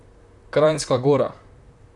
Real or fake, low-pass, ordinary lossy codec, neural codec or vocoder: fake; 10.8 kHz; none; vocoder, 44.1 kHz, 128 mel bands, Pupu-Vocoder